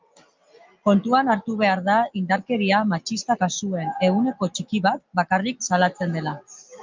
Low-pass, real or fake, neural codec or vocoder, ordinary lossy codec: 7.2 kHz; real; none; Opus, 24 kbps